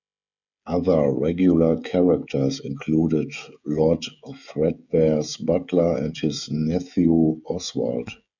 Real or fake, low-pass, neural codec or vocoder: fake; 7.2 kHz; codec, 16 kHz, 16 kbps, FreqCodec, smaller model